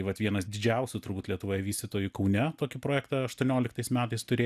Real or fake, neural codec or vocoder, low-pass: real; none; 14.4 kHz